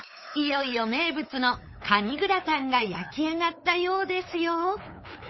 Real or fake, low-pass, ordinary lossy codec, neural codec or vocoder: fake; 7.2 kHz; MP3, 24 kbps; codec, 16 kHz, 8 kbps, FunCodec, trained on LibriTTS, 25 frames a second